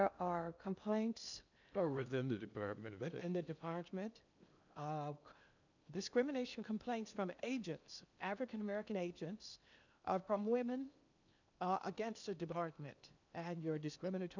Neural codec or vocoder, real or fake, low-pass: codec, 16 kHz in and 24 kHz out, 0.8 kbps, FocalCodec, streaming, 65536 codes; fake; 7.2 kHz